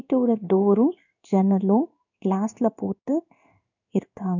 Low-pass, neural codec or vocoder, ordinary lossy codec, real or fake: 7.2 kHz; codec, 16 kHz in and 24 kHz out, 1 kbps, XY-Tokenizer; none; fake